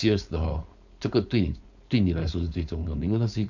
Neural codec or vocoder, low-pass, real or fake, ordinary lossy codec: codec, 16 kHz, 4.8 kbps, FACodec; 7.2 kHz; fake; none